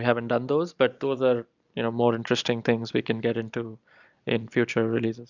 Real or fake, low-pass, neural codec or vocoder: real; 7.2 kHz; none